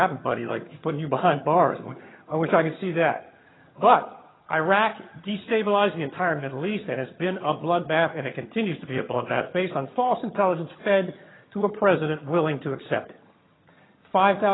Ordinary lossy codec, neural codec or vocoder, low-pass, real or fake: AAC, 16 kbps; vocoder, 22.05 kHz, 80 mel bands, HiFi-GAN; 7.2 kHz; fake